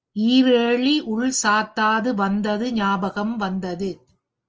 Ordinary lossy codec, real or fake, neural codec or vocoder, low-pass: Opus, 24 kbps; real; none; 7.2 kHz